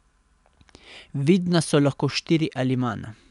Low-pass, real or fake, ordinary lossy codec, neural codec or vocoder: 10.8 kHz; real; none; none